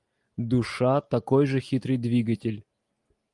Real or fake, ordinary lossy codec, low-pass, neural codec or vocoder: real; Opus, 32 kbps; 10.8 kHz; none